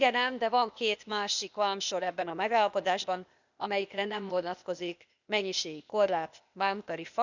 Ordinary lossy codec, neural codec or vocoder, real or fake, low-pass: none; codec, 16 kHz, 0.8 kbps, ZipCodec; fake; 7.2 kHz